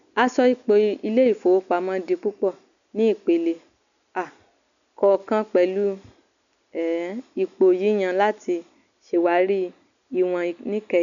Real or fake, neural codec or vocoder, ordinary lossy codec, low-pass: real; none; none; 7.2 kHz